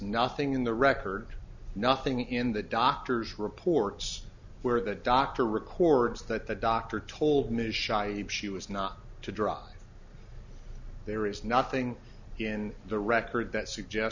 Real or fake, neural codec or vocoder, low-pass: real; none; 7.2 kHz